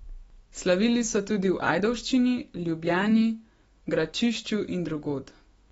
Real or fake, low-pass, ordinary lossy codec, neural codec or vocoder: fake; 19.8 kHz; AAC, 24 kbps; autoencoder, 48 kHz, 128 numbers a frame, DAC-VAE, trained on Japanese speech